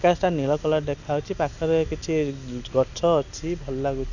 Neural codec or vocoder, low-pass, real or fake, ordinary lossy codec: none; 7.2 kHz; real; none